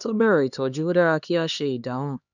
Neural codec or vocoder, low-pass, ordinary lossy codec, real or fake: codec, 16 kHz, 2 kbps, X-Codec, HuBERT features, trained on LibriSpeech; 7.2 kHz; none; fake